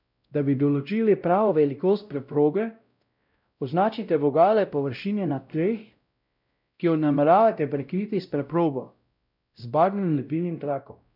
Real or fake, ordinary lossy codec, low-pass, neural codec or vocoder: fake; none; 5.4 kHz; codec, 16 kHz, 0.5 kbps, X-Codec, WavLM features, trained on Multilingual LibriSpeech